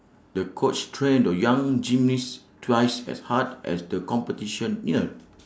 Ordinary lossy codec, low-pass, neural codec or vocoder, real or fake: none; none; none; real